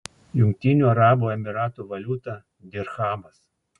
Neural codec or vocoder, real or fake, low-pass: none; real; 10.8 kHz